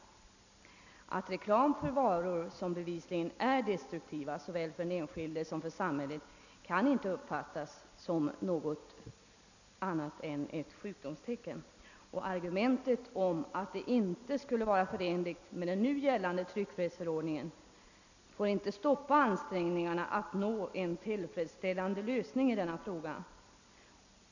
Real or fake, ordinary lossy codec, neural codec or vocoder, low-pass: real; none; none; 7.2 kHz